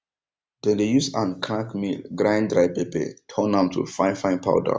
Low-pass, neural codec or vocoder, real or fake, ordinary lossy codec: none; none; real; none